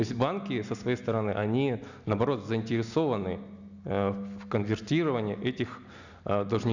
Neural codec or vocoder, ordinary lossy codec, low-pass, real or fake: none; none; 7.2 kHz; real